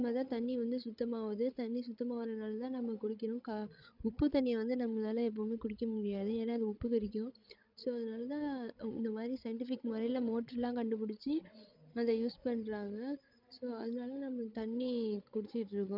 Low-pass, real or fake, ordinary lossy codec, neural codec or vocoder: 5.4 kHz; fake; none; codec, 16 kHz, 16 kbps, FreqCodec, larger model